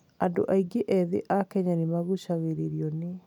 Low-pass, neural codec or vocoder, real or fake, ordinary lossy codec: 19.8 kHz; none; real; none